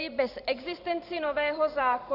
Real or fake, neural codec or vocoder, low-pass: fake; vocoder, 44.1 kHz, 128 mel bands every 512 samples, BigVGAN v2; 5.4 kHz